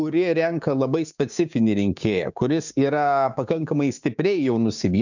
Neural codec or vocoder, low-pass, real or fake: codec, 16 kHz, 6 kbps, DAC; 7.2 kHz; fake